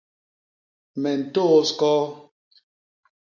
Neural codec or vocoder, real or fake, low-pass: none; real; 7.2 kHz